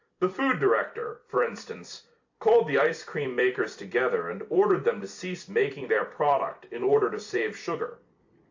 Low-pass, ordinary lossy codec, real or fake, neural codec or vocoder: 7.2 kHz; AAC, 48 kbps; fake; vocoder, 44.1 kHz, 128 mel bands every 256 samples, BigVGAN v2